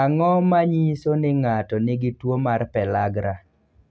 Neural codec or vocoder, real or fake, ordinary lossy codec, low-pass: none; real; none; none